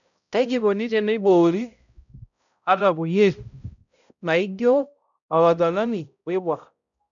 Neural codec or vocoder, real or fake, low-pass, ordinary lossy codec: codec, 16 kHz, 0.5 kbps, X-Codec, HuBERT features, trained on balanced general audio; fake; 7.2 kHz; none